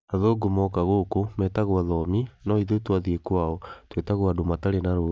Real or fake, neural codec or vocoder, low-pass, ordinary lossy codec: real; none; none; none